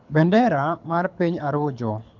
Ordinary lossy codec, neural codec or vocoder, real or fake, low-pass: none; codec, 24 kHz, 6 kbps, HILCodec; fake; 7.2 kHz